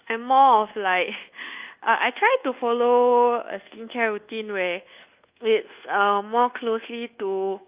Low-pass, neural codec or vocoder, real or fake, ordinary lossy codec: 3.6 kHz; codec, 24 kHz, 1.2 kbps, DualCodec; fake; Opus, 24 kbps